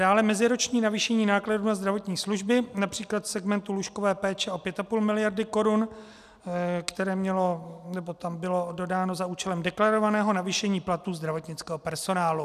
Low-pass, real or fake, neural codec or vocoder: 14.4 kHz; real; none